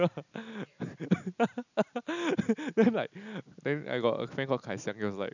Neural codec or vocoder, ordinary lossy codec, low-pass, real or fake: none; none; 7.2 kHz; real